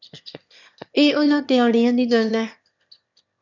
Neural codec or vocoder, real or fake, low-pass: autoencoder, 22.05 kHz, a latent of 192 numbers a frame, VITS, trained on one speaker; fake; 7.2 kHz